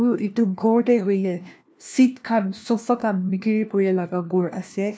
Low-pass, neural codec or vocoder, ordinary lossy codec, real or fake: none; codec, 16 kHz, 1 kbps, FunCodec, trained on LibriTTS, 50 frames a second; none; fake